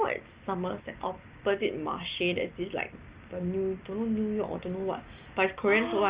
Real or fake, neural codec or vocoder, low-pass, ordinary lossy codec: real; none; 3.6 kHz; Opus, 24 kbps